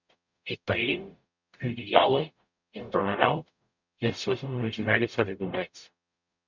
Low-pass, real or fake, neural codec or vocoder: 7.2 kHz; fake; codec, 44.1 kHz, 0.9 kbps, DAC